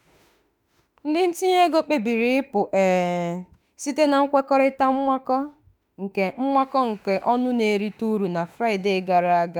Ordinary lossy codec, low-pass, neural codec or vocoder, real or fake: none; none; autoencoder, 48 kHz, 32 numbers a frame, DAC-VAE, trained on Japanese speech; fake